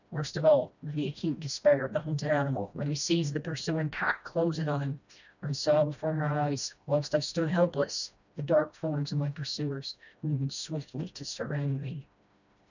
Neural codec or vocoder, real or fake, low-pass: codec, 16 kHz, 1 kbps, FreqCodec, smaller model; fake; 7.2 kHz